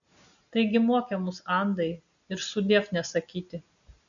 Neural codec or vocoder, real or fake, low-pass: none; real; 7.2 kHz